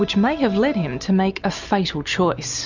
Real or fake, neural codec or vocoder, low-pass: real; none; 7.2 kHz